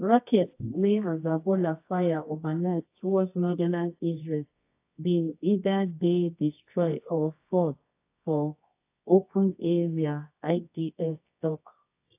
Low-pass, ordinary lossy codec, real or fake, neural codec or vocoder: 3.6 kHz; AAC, 24 kbps; fake; codec, 24 kHz, 0.9 kbps, WavTokenizer, medium music audio release